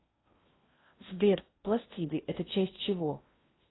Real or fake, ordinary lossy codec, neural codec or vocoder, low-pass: fake; AAC, 16 kbps; codec, 16 kHz in and 24 kHz out, 0.6 kbps, FocalCodec, streaming, 2048 codes; 7.2 kHz